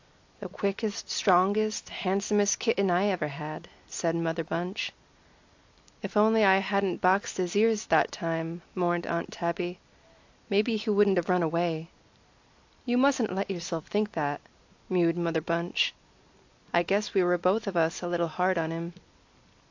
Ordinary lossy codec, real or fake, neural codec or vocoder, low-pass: AAC, 48 kbps; real; none; 7.2 kHz